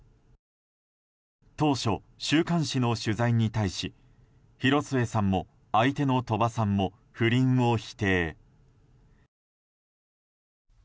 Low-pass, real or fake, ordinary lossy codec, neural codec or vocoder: none; real; none; none